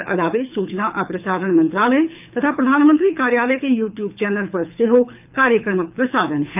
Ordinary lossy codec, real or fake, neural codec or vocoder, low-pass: none; fake; codec, 24 kHz, 6 kbps, HILCodec; 3.6 kHz